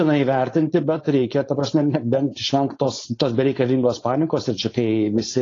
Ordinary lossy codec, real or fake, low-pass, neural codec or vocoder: AAC, 32 kbps; fake; 7.2 kHz; codec, 16 kHz, 4.8 kbps, FACodec